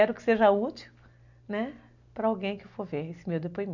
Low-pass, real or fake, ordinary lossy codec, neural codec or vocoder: 7.2 kHz; real; none; none